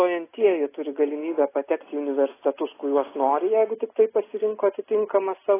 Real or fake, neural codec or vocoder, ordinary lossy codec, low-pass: real; none; AAC, 16 kbps; 3.6 kHz